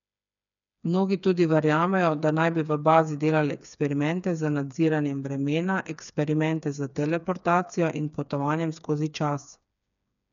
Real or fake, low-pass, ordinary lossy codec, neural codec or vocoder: fake; 7.2 kHz; none; codec, 16 kHz, 4 kbps, FreqCodec, smaller model